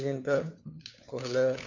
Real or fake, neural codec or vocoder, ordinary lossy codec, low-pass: fake; codec, 16 kHz, 4 kbps, FunCodec, trained on LibriTTS, 50 frames a second; none; 7.2 kHz